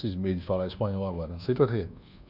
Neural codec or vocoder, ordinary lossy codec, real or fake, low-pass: codec, 24 kHz, 1.2 kbps, DualCodec; none; fake; 5.4 kHz